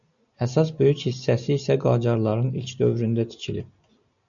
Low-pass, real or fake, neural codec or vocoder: 7.2 kHz; real; none